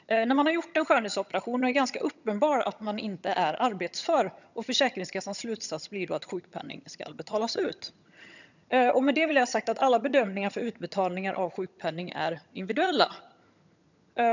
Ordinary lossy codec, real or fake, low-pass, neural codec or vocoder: none; fake; 7.2 kHz; vocoder, 22.05 kHz, 80 mel bands, HiFi-GAN